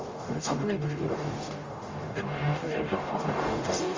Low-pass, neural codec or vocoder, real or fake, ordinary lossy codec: 7.2 kHz; codec, 44.1 kHz, 0.9 kbps, DAC; fake; Opus, 32 kbps